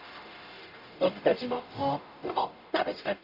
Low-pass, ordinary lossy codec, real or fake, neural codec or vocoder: 5.4 kHz; none; fake; codec, 44.1 kHz, 0.9 kbps, DAC